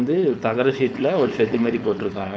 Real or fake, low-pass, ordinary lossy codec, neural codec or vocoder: fake; none; none; codec, 16 kHz, 4.8 kbps, FACodec